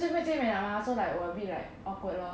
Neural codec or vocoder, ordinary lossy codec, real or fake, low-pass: none; none; real; none